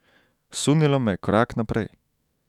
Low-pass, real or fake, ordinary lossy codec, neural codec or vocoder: 19.8 kHz; fake; none; autoencoder, 48 kHz, 128 numbers a frame, DAC-VAE, trained on Japanese speech